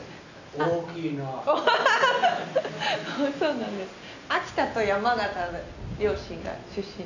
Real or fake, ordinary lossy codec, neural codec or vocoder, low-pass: real; none; none; 7.2 kHz